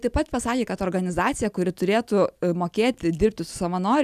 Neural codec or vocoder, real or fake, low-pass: none; real; 14.4 kHz